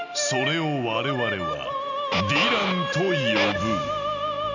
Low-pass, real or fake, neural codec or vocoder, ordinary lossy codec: 7.2 kHz; real; none; none